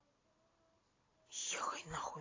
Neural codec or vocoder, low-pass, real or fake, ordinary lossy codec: none; 7.2 kHz; real; AAC, 32 kbps